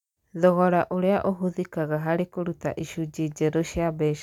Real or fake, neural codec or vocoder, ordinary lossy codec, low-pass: real; none; none; 19.8 kHz